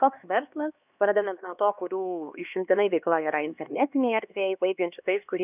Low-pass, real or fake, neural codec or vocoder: 3.6 kHz; fake; codec, 16 kHz, 2 kbps, X-Codec, HuBERT features, trained on LibriSpeech